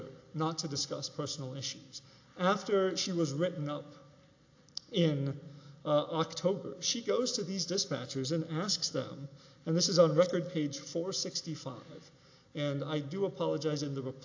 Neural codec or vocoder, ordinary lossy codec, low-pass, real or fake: none; AAC, 48 kbps; 7.2 kHz; real